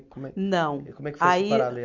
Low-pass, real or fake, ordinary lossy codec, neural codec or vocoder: 7.2 kHz; real; Opus, 64 kbps; none